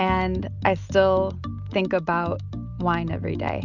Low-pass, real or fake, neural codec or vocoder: 7.2 kHz; real; none